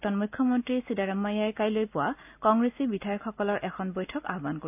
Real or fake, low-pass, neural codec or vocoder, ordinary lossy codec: real; 3.6 kHz; none; AAC, 32 kbps